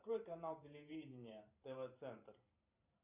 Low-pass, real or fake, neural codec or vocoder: 3.6 kHz; fake; vocoder, 44.1 kHz, 128 mel bands every 512 samples, BigVGAN v2